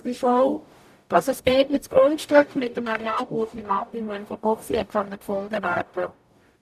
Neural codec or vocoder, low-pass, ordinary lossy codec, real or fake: codec, 44.1 kHz, 0.9 kbps, DAC; 14.4 kHz; none; fake